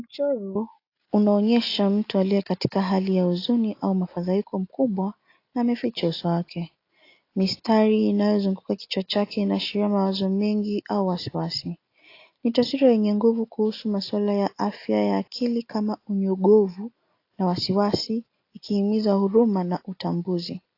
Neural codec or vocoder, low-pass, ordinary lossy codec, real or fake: none; 5.4 kHz; AAC, 32 kbps; real